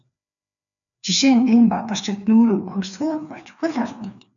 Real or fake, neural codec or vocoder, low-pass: fake; codec, 16 kHz, 2 kbps, FreqCodec, larger model; 7.2 kHz